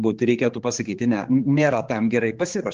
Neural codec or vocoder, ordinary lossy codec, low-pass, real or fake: codec, 16 kHz, 4 kbps, X-Codec, HuBERT features, trained on general audio; Opus, 16 kbps; 7.2 kHz; fake